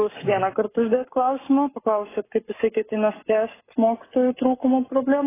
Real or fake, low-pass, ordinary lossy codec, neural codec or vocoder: fake; 3.6 kHz; AAC, 16 kbps; codec, 44.1 kHz, 7.8 kbps, Pupu-Codec